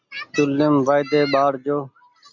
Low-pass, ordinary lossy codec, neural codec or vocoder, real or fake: 7.2 kHz; MP3, 64 kbps; none; real